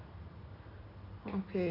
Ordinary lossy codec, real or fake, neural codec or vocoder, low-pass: none; real; none; 5.4 kHz